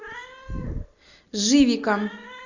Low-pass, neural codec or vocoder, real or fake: 7.2 kHz; none; real